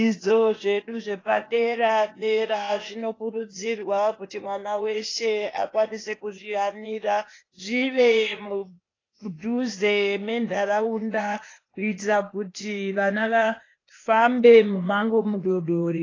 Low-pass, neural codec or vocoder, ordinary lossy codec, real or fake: 7.2 kHz; codec, 16 kHz, 0.8 kbps, ZipCodec; AAC, 32 kbps; fake